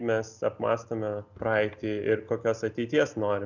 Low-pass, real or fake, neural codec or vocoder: 7.2 kHz; real; none